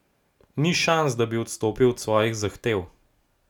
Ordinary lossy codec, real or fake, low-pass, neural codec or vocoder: none; real; 19.8 kHz; none